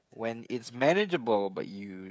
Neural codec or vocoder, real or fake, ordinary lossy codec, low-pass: codec, 16 kHz, 16 kbps, FreqCodec, smaller model; fake; none; none